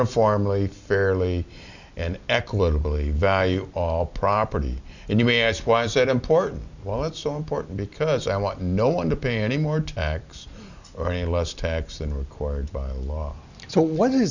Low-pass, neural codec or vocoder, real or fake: 7.2 kHz; none; real